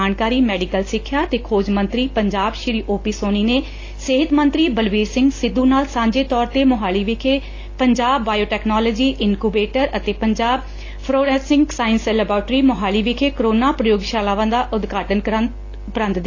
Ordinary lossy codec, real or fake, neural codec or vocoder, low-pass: AAC, 32 kbps; real; none; 7.2 kHz